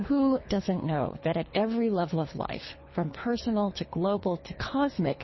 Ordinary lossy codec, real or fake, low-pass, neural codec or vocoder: MP3, 24 kbps; fake; 7.2 kHz; codec, 24 kHz, 3 kbps, HILCodec